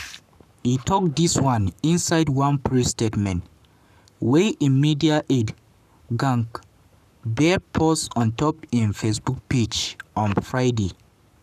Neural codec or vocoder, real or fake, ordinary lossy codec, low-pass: codec, 44.1 kHz, 7.8 kbps, Pupu-Codec; fake; none; 14.4 kHz